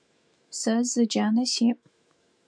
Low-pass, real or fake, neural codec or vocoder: 9.9 kHz; fake; autoencoder, 48 kHz, 128 numbers a frame, DAC-VAE, trained on Japanese speech